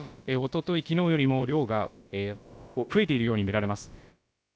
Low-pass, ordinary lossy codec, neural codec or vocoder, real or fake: none; none; codec, 16 kHz, about 1 kbps, DyCAST, with the encoder's durations; fake